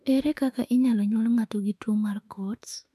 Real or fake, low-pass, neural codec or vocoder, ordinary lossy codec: fake; 14.4 kHz; autoencoder, 48 kHz, 32 numbers a frame, DAC-VAE, trained on Japanese speech; none